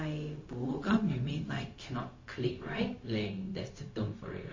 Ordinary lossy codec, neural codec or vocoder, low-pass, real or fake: MP3, 32 kbps; codec, 16 kHz, 0.4 kbps, LongCat-Audio-Codec; 7.2 kHz; fake